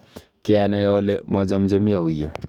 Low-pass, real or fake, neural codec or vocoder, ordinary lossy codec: 19.8 kHz; fake; codec, 44.1 kHz, 2.6 kbps, DAC; none